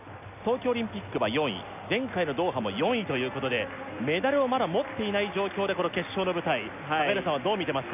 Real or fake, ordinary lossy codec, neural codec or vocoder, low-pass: real; none; none; 3.6 kHz